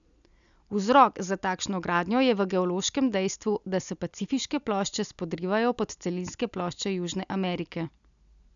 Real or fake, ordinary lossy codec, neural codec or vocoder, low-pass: real; none; none; 7.2 kHz